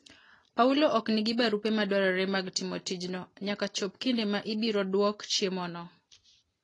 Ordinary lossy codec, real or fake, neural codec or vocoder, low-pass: AAC, 32 kbps; real; none; 10.8 kHz